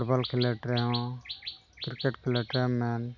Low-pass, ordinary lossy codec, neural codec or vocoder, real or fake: 7.2 kHz; none; none; real